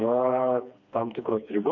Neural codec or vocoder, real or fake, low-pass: codec, 16 kHz, 2 kbps, FreqCodec, smaller model; fake; 7.2 kHz